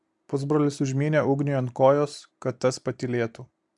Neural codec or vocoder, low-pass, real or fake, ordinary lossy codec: none; 10.8 kHz; real; MP3, 96 kbps